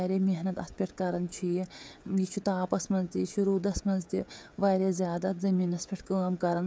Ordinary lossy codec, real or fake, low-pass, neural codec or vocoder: none; fake; none; codec, 16 kHz, 16 kbps, FreqCodec, smaller model